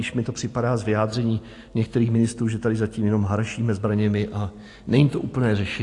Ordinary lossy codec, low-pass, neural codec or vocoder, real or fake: AAC, 48 kbps; 10.8 kHz; none; real